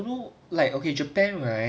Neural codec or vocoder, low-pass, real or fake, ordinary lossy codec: none; none; real; none